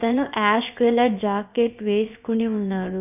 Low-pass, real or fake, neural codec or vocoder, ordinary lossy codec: 3.6 kHz; fake; codec, 16 kHz, about 1 kbps, DyCAST, with the encoder's durations; none